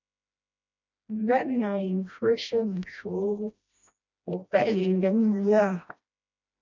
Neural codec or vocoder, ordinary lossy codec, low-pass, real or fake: codec, 16 kHz, 1 kbps, FreqCodec, smaller model; MP3, 64 kbps; 7.2 kHz; fake